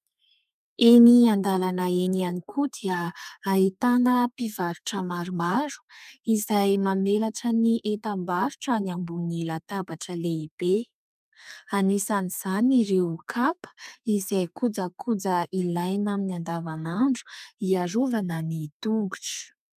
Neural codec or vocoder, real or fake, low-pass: codec, 32 kHz, 1.9 kbps, SNAC; fake; 14.4 kHz